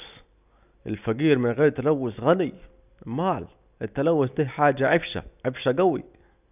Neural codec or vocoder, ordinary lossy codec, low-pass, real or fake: none; none; 3.6 kHz; real